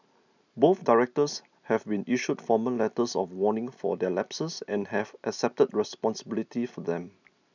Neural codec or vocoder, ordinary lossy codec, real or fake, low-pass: none; none; real; 7.2 kHz